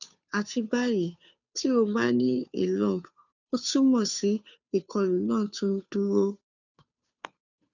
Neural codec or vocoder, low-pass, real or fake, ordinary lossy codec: codec, 16 kHz, 2 kbps, FunCodec, trained on Chinese and English, 25 frames a second; 7.2 kHz; fake; none